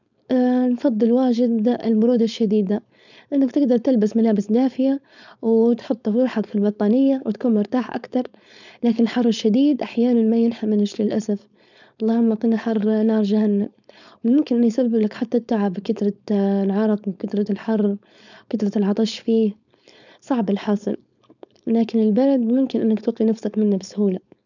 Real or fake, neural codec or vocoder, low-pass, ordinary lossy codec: fake; codec, 16 kHz, 4.8 kbps, FACodec; 7.2 kHz; none